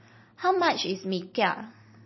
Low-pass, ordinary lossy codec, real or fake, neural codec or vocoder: 7.2 kHz; MP3, 24 kbps; real; none